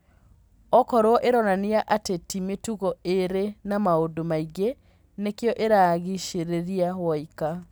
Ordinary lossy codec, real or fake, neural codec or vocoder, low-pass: none; real; none; none